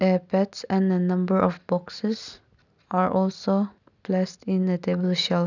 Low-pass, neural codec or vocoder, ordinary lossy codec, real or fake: 7.2 kHz; none; none; real